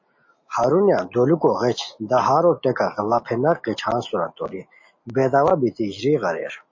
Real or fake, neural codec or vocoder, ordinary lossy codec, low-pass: real; none; MP3, 32 kbps; 7.2 kHz